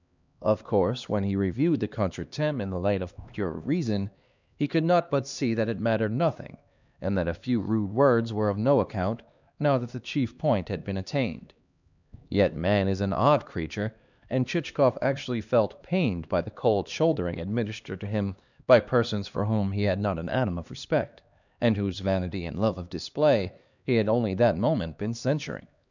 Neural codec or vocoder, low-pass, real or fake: codec, 16 kHz, 2 kbps, X-Codec, HuBERT features, trained on LibriSpeech; 7.2 kHz; fake